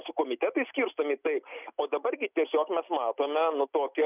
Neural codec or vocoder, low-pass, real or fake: none; 3.6 kHz; real